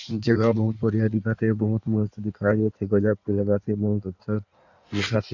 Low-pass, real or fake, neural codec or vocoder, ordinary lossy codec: 7.2 kHz; fake; codec, 16 kHz in and 24 kHz out, 1.1 kbps, FireRedTTS-2 codec; none